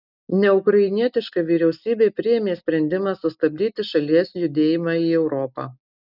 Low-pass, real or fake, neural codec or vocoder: 5.4 kHz; real; none